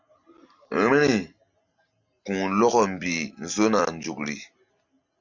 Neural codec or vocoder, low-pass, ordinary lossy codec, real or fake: none; 7.2 kHz; MP3, 64 kbps; real